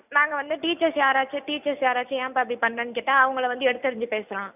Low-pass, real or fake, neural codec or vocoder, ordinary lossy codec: 3.6 kHz; real; none; none